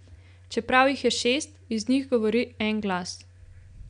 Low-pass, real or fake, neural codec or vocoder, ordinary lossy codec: 9.9 kHz; real; none; none